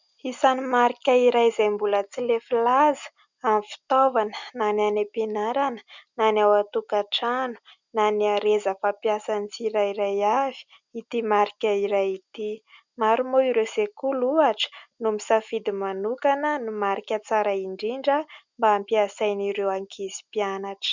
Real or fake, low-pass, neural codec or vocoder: real; 7.2 kHz; none